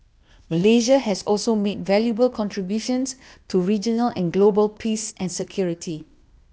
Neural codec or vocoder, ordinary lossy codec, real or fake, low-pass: codec, 16 kHz, 0.8 kbps, ZipCodec; none; fake; none